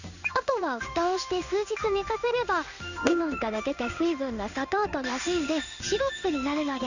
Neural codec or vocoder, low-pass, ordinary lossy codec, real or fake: codec, 16 kHz in and 24 kHz out, 1 kbps, XY-Tokenizer; 7.2 kHz; none; fake